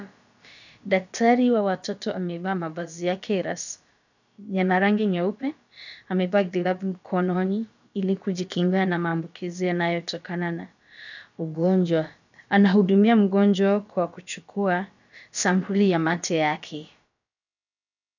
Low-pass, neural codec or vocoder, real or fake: 7.2 kHz; codec, 16 kHz, about 1 kbps, DyCAST, with the encoder's durations; fake